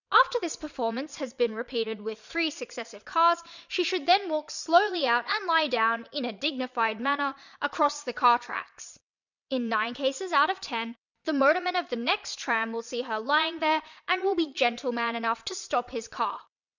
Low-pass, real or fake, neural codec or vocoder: 7.2 kHz; fake; vocoder, 22.05 kHz, 80 mel bands, Vocos